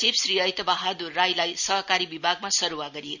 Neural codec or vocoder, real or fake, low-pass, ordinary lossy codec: none; real; 7.2 kHz; none